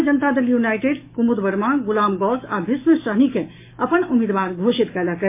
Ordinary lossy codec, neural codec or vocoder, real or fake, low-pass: MP3, 24 kbps; autoencoder, 48 kHz, 128 numbers a frame, DAC-VAE, trained on Japanese speech; fake; 3.6 kHz